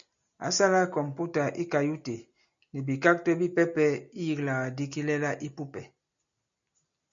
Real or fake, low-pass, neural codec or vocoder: real; 7.2 kHz; none